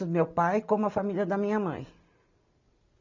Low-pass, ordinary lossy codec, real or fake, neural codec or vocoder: 7.2 kHz; none; real; none